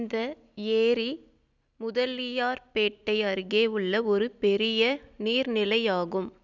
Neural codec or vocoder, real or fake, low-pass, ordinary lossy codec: none; real; 7.2 kHz; none